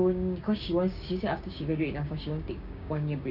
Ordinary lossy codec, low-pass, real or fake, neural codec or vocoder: none; 5.4 kHz; fake; codec, 16 kHz, 6 kbps, DAC